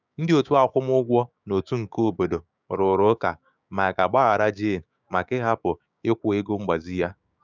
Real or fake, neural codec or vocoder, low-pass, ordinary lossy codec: fake; codec, 16 kHz, 6 kbps, DAC; 7.2 kHz; none